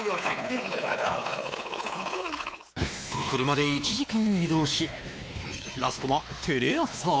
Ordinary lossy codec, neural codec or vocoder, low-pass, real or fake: none; codec, 16 kHz, 2 kbps, X-Codec, WavLM features, trained on Multilingual LibriSpeech; none; fake